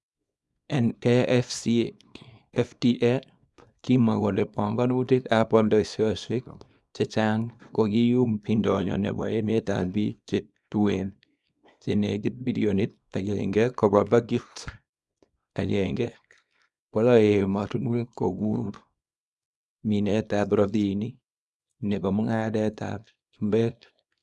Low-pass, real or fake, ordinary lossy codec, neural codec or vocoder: none; fake; none; codec, 24 kHz, 0.9 kbps, WavTokenizer, small release